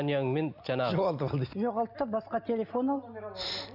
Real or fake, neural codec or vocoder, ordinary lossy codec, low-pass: real; none; none; 5.4 kHz